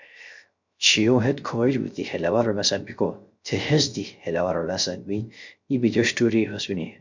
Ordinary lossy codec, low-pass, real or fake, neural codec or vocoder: MP3, 64 kbps; 7.2 kHz; fake; codec, 16 kHz, 0.3 kbps, FocalCodec